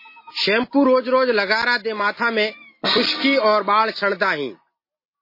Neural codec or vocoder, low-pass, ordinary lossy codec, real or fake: none; 5.4 kHz; MP3, 24 kbps; real